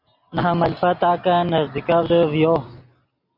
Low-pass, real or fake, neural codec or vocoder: 5.4 kHz; real; none